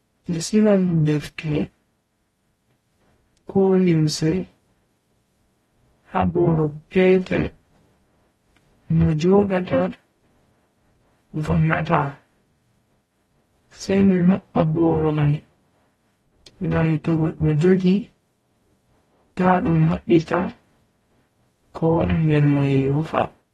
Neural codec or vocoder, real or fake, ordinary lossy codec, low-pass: codec, 44.1 kHz, 0.9 kbps, DAC; fake; AAC, 32 kbps; 19.8 kHz